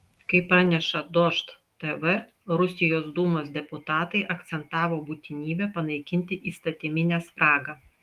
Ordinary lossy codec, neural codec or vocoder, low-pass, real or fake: Opus, 32 kbps; autoencoder, 48 kHz, 128 numbers a frame, DAC-VAE, trained on Japanese speech; 14.4 kHz; fake